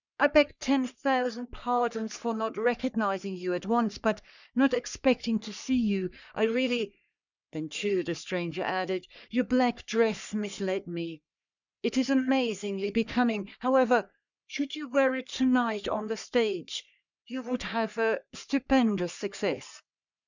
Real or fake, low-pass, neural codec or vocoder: fake; 7.2 kHz; codec, 44.1 kHz, 3.4 kbps, Pupu-Codec